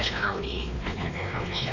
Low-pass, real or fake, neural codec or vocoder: 7.2 kHz; fake; codec, 16 kHz, 2 kbps, X-Codec, WavLM features, trained on Multilingual LibriSpeech